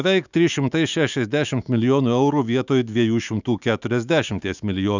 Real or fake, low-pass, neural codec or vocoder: fake; 7.2 kHz; autoencoder, 48 kHz, 128 numbers a frame, DAC-VAE, trained on Japanese speech